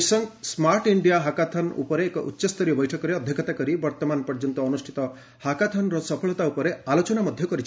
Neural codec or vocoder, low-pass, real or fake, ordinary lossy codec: none; none; real; none